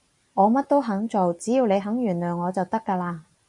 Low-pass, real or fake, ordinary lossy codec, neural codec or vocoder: 10.8 kHz; real; MP3, 64 kbps; none